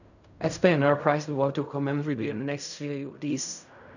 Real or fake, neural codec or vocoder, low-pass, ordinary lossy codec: fake; codec, 16 kHz in and 24 kHz out, 0.4 kbps, LongCat-Audio-Codec, fine tuned four codebook decoder; 7.2 kHz; none